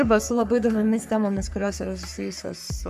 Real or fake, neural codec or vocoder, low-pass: fake; codec, 44.1 kHz, 3.4 kbps, Pupu-Codec; 14.4 kHz